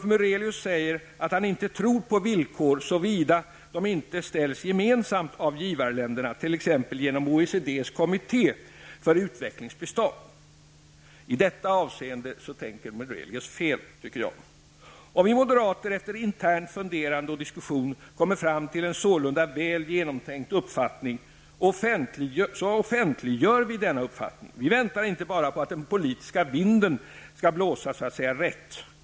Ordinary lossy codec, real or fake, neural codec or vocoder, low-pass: none; real; none; none